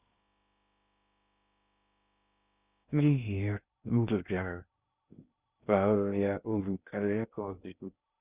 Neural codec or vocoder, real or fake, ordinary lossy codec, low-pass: codec, 16 kHz in and 24 kHz out, 0.6 kbps, FocalCodec, streaming, 2048 codes; fake; Opus, 64 kbps; 3.6 kHz